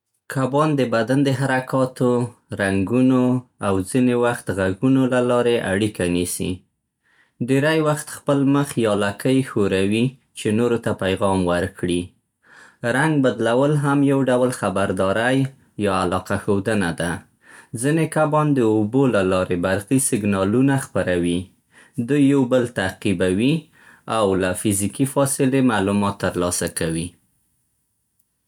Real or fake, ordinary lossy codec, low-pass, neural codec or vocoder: real; none; 19.8 kHz; none